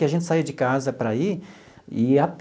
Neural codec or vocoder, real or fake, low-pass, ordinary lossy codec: none; real; none; none